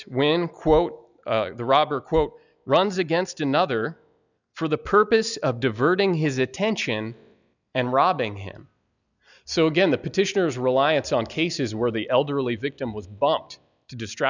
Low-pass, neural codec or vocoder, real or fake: 7.2 kHz; none; real